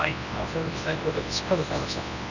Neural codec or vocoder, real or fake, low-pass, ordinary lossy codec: codec, 24 kHz, 0.9 kbps, WavTokenizer, large speech release; fake; 7.2 kHz; none